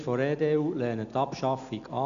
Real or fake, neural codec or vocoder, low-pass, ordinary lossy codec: real; none; 7.2 kHz; none